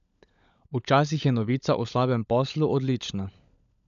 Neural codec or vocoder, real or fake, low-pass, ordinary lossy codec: codec, 16 kHz, 16 kbps, FunCodec, trained on LibriTTS, 50 frames a second; fake; 7.2 kHz; none